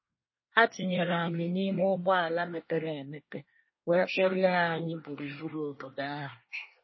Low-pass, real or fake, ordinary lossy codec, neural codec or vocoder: 7.2 kHz; fake; MP3, 24 kbps; codec, 24 kHz, 1 kbps, SNAC